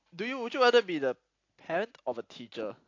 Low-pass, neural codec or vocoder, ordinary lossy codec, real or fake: 7.2 kHz; none; AAC, 48 kbps; real